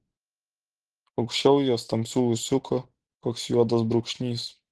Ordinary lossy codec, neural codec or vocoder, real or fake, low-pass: Opus, 16 kbps; none; real; 10.8 kHz